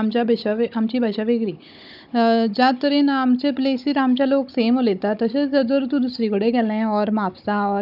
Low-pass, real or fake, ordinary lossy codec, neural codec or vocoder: 5.4 kHz; fake; none; codec, 16 kHz, 16 kbps, FunCodec, trained on Chinese and English, 50 frames a second